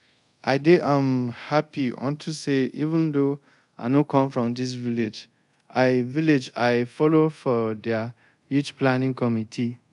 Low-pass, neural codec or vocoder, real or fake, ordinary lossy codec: 10.8 kHz; codec, 24 kHz, 0.5 kbps, DualCodec; fake; none